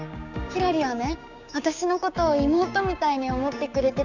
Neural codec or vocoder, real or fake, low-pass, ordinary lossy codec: codec, 16 kHz, 6 kbps, DAC; fake; 7.2 kHz; none